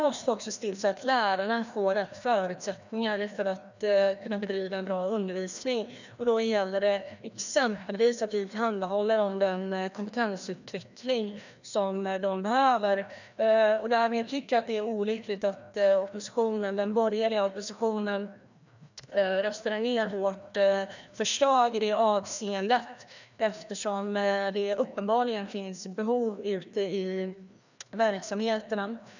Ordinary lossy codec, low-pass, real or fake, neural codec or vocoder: none; 7.2 kHz; fake; codec, 16 kHz, 1 kbps, FreqCodec, larger model